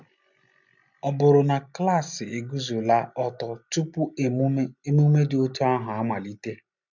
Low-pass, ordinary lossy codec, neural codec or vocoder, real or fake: 7.2 kHz; none; none; real